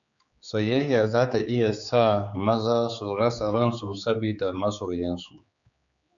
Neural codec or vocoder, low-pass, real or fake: codec, 16 kHz, 4 kbps, X-Codec, HuBERT features, trained on general audio; 7.2 kHz; fake